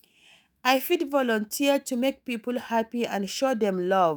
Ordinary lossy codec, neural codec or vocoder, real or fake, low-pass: none; autoencoder, 48 kHz, 128 numbers a frame, DAC-VAE, trained on Japanese speech; fake; none